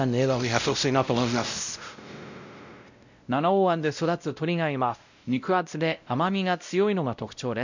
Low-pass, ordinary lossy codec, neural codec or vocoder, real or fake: 7.2 kHz; none; codec, 16 kHz, 0.5 kbps, X-Codec, WavLM features, trained on Multilingual LibriSpeech; fake